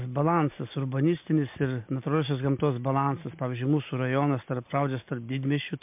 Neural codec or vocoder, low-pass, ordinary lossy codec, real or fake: none; 3.6 kHz; MP3, 32 kbps; real